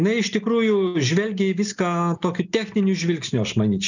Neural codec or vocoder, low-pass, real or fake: none; 7.2 kHz; real